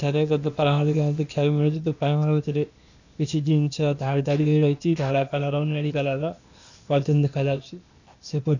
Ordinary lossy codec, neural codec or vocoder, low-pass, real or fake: none; codec, 16 kHz, 0.8 kbps, ZipCodec; 7.2 kHz; fake